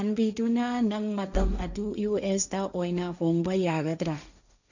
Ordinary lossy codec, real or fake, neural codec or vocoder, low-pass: none; fake; codec, 16 kHz, 1.1 kbps, Voila-Tokenizer; 7.2 kHz